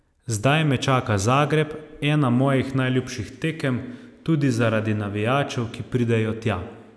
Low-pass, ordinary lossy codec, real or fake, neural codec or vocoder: none; none; real; none